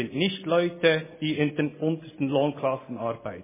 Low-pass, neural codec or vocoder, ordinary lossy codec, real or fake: 3.6 kHz; none; MP3, 16 kbps; real